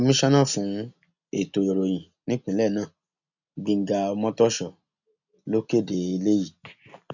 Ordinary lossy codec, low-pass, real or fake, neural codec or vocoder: none; 7.2 kHz; real; none